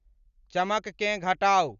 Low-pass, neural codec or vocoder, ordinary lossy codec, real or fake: 7.2 kHz; none; Opus, 64 kbps; real